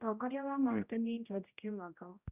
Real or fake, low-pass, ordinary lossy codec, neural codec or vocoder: fake; 3.6 kHz; Opus, 64 kbps; codec, 16 kHz, 0.5 kbps, X-Codec, HuBERT features, trained on general audio